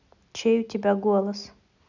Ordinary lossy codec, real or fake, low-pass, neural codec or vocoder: none; real; 7.2 kHz; none